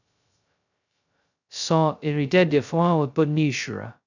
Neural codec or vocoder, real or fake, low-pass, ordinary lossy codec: codec, 16 kHz, 0.2 kbps, FocalCodec; fake; 7.2 kHz; none